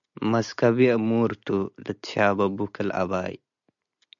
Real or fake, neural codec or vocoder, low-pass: real; none; 7.2 kHz